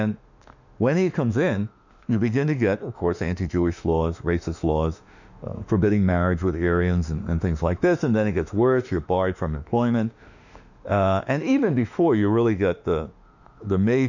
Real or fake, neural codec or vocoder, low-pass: fake; autoencoder, 48 kHz, 32 numbers a frame, DAC-VAE, trained on Japanese speech; 7.2 kHz